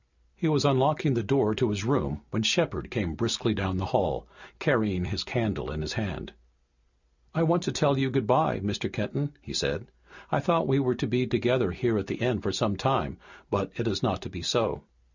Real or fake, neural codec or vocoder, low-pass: real; none; 7.2 kHz